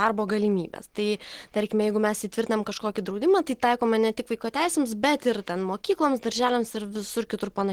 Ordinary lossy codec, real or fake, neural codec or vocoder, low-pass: Opus, 16 kbps; real; none; 19.8 kHz